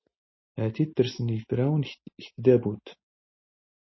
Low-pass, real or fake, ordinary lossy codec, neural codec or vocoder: 7.2 kHz; real; MP3, 24 kbps; none